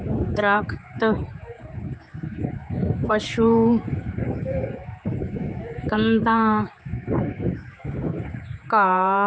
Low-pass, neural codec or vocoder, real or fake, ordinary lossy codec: none; codec, 16 kHz, 4 kbps, X-Codec, HuBERT features, trained on general audio; fake; none